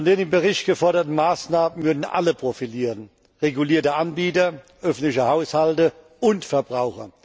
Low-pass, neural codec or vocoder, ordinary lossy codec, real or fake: none; none; none; real